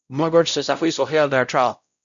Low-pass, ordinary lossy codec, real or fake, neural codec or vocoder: 7.2 kHz; MP3, 96 kbps; fake; codec, 16 kHz, 0.5 kbps, X-Codec, WavLM features, trained on Multilingual LibriSpeech